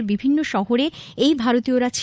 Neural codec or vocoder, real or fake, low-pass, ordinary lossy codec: codec, 16 kHz, 8 kbps, FunCodec, trained on Chinese and English, 25 frames a second; fake; none; none